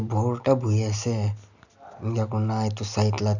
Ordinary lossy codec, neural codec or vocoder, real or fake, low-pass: none; none; real; 7.2 kHz